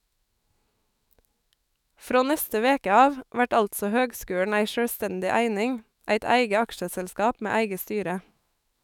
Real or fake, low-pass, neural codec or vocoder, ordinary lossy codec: fake; 19.8 kHz; autoencoder, 48 kHz, 128 numbers a frame, DAC-VAE, trained on Japanese speech; none